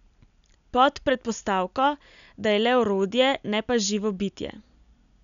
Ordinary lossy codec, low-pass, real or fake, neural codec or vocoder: none; 7.2 kHz; real; none